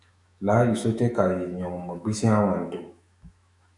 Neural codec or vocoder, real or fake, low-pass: autoencoder, 48 kHz, 128 numbers a frame, DAC-VAE, trained on Japanese speech; fake; 10.8 kHz